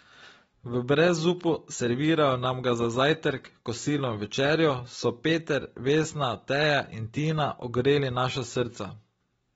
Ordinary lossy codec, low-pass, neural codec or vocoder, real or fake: AAC, 24 kbps; 19.8 kHz; none; real